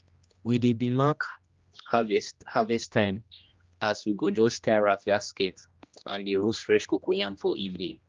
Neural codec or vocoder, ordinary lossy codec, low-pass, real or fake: codec, 16 kHz, 1 kbps, X-Codec, HuBERT features, trained on general audio; Opus, 32 kbps; 7.2 kHz; fake